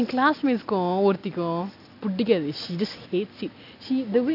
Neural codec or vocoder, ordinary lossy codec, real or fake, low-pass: none; none; real; 5.4 kHz